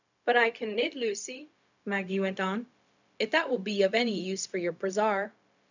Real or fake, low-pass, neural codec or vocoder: fake; 7.2 kHz; codec, 16 kHz, 0.4 kbps, LongCat-Audio-Codec